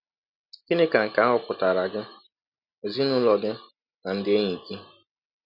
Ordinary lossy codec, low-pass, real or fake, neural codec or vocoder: none; 5.4 kHz; real; none